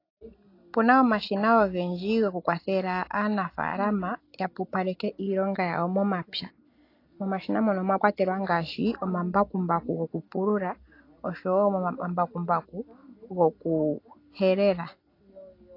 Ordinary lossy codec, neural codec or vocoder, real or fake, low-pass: AAC, 32 kbps; none; real; 5.4 kHz